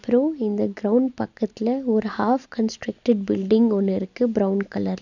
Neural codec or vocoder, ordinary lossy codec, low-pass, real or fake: none; none; 7.2 kHz; real